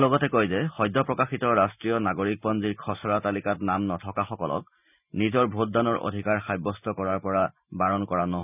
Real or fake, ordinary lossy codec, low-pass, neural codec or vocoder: real; none; 3.6 kHz; none